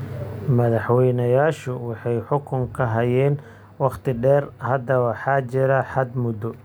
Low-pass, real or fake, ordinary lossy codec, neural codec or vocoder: none; real; none; none